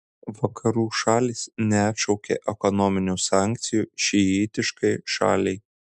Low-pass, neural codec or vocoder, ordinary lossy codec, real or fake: 10.8 kHz; none; MP3, 96 kbps; real